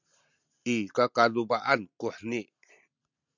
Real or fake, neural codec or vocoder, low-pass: real; none; 7.2 kHz